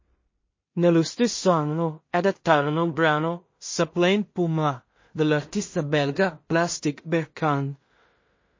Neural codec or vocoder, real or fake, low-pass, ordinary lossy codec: codec, 16 kHz in and 24 kHz out, 0.4 kbps, LongCat-Audio-Codec, two codebook decoder; fake; 7.2 kHz; MP3, 32 kbps